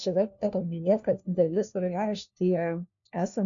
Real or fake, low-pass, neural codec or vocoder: fake; 7.2 kHz; codec, 16 kHz, 1 kbps, FunCodec, trained on LibriTTS, 50 frames a second